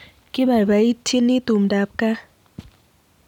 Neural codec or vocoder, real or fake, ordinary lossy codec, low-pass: none; real; none; 19.8 kHz